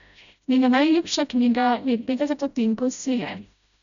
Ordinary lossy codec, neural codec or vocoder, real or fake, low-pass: none; codec, 16 kHz, 0.5 kbps, FreqCodec, smaller model; fake; 7.2 kHz